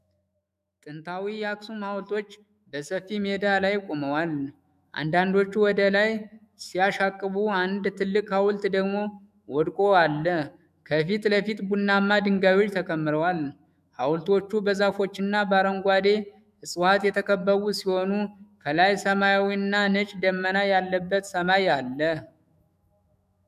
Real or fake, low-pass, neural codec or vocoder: fake; 14.4 kHz; autoencoder, 48 kHz, 128 numbers a frame, DAC-VAE, trained on Japanese speech